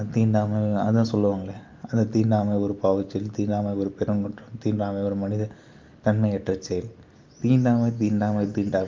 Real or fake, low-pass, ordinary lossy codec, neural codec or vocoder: real; 7.2 kHz; Opus, 24 kbps; none